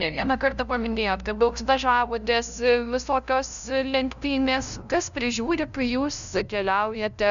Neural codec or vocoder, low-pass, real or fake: codec, 16 kHz, 0.5 kbps, FunCodec, trained on LibriTTS, 25 frames a second; 7.2 kHz; fake